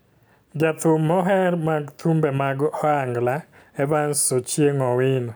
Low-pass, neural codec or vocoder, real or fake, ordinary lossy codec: none; none; real; none